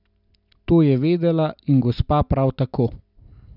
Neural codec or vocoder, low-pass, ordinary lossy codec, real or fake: none; 5.4 kHz; none; real